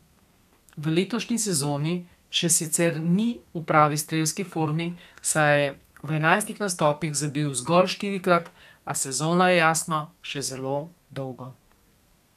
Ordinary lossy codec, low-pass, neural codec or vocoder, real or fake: none; 14.4 kHz; codec, 32 kHz, 1.9 kbps, SNAC; fake